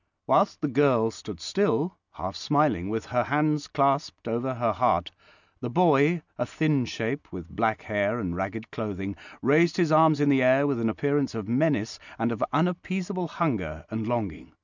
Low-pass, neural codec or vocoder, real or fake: 7.2 kHz; none; real